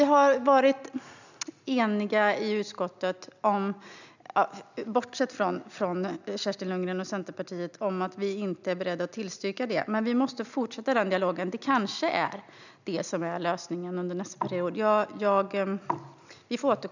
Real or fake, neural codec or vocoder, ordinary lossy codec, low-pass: real; none; none; 7.2 kHz